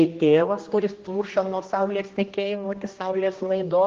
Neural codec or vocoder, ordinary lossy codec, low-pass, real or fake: codec, 16 kHz, 1 kbps, X-Codec, HuBERT features, trained on general audio; Opus, 16 kbps; 7.2 kHz; fake